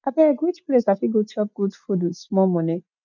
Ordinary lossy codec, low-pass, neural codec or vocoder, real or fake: none; 7.2 kHz; none; real